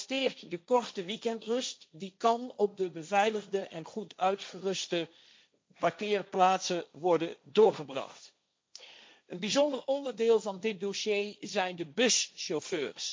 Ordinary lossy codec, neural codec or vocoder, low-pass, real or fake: none; codec, 16 kHz, 1.1 kbps, Voila-Tokenizer; none; fake